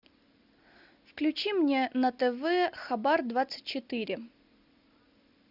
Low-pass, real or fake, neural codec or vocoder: 5.4 kHz; real; none